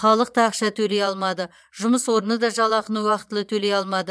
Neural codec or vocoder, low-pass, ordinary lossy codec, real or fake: vocoder, 22.05 kHz, 80 mel bands, Vocos; none; none; fake